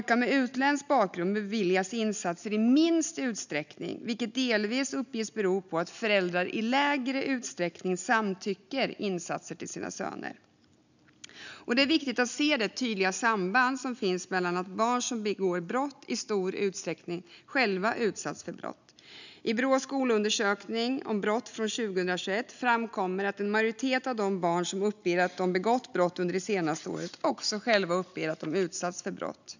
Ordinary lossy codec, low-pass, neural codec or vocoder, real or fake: none; 7.2 kHz; none; real